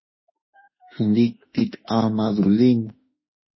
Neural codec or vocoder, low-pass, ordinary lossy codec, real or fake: autoencoder, 48 kHz, 32 numbers a frame, DAC-VAE, trained on Japanese speech; 7.2 kHz; MP3, 24 kbps; fake